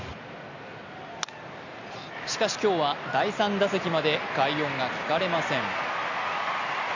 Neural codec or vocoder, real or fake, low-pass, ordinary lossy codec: none; real; 7.2 kHz; none